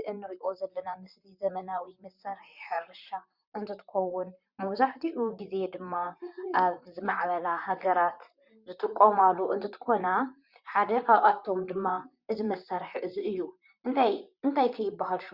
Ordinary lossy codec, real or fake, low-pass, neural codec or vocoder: Opus, 64 kbps; fake; 5.4 kHz; vocoder, 44.1 kHz, 128 mel bands, Pupu-Vocoder